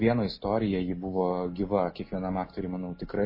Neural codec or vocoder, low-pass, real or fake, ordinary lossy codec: none; 5.4 kHz; real; MP3, 24 kbps